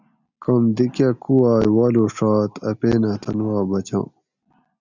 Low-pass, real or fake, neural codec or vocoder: 7.2 kHz; real; none